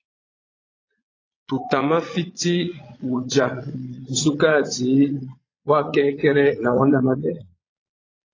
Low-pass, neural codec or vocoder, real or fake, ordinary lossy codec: 7.2 kHz; vocoder, 22.05 kHz, 80 mel bands, Vocos; fake; AAC, 32 kbps